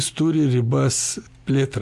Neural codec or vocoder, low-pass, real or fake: none; 14.4 kHz; real